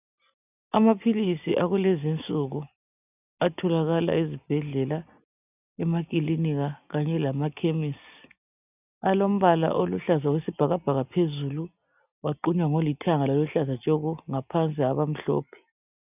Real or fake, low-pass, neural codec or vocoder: real; 3.6 kHz; none